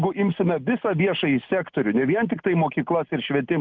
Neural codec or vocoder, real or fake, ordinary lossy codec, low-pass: none; real; Opus, 24 kbps; 7.2 kHz